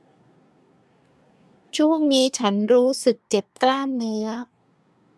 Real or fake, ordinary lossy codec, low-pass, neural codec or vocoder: fake; none; none; codec, 24 kHz, 1 kbps, SNAC